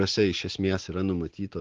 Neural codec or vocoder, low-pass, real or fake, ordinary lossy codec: none; 7.2 kHz; real; Opus, 16 kbps